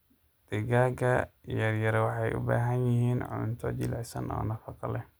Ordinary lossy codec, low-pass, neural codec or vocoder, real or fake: none; none; none; real